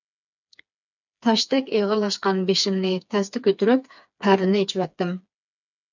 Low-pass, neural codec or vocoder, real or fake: 7.2 kHz; codec, 16 kHz, 4 kbps, FreqCodec, smaller model; fake